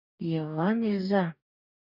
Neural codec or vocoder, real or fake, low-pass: codec, 44.1 kHz, 2.6 kbps, DAC; fake; 5.4 kHz